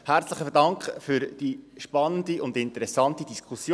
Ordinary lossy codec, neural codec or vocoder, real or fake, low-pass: none; none; real; none